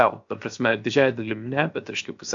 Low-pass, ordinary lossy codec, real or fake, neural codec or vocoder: 7.2 kHz; AAC, 64 kbps; fake; codec, 16 kHz, 0.7 kbps, FocalCodec